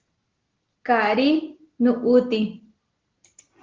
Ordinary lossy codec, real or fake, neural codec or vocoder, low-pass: Opus, 16 kbps; real; none; 7.2 kHz